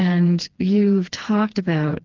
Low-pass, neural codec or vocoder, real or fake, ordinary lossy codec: 7.2 kHz; codec, 16 kHz, 2 kbps, FreqCodec, smaller model; fake; Opus, 16 kbps